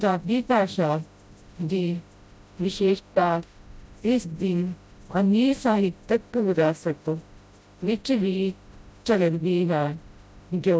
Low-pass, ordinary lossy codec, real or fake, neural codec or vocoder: none; none; fake; codec, 16 kHz, 0.5 kbps, FreqCodec, smaller model